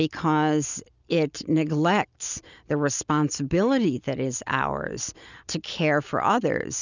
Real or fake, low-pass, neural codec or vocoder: real; 7.2 kHz; none